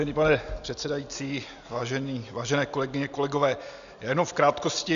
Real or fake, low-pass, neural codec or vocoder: real; 7.2 kHz; none